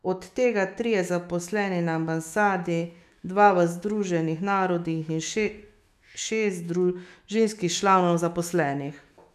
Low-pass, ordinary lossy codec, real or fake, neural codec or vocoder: 14.4 kHz; none; fake; autoencoder, 48 kHz, 128 numbers a frame, DAC-VAE, trained on Japanese speech